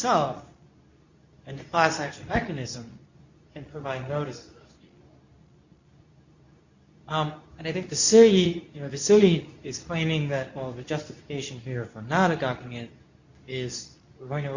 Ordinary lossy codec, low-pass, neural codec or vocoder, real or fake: Opus, 64 kbps; 7.2 kHz; codec, 24 kHz, 0.9 kbps, WavTokenizer, medium speech release version 2; fake